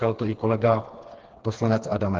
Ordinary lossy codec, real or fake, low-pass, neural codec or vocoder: Opus, 16 kbps; fake; 7.2 kHz; codec, 16 kHz, 4 kbps, FreqCodec, smaller model